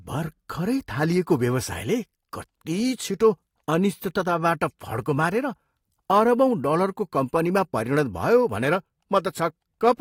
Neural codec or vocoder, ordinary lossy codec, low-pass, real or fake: none; AAC, 48 kbps; 19.8 kHz; real